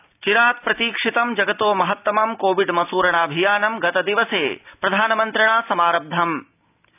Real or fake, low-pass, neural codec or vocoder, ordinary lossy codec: real; 3.6 kHz; none; none